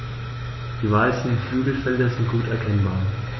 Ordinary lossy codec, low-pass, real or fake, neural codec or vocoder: MP3, 24 kbps; 7.2 kHz; fake; autoencoder, 48 kHz, 128 numbers a frame, DAC-VAE, trained on Japanese speech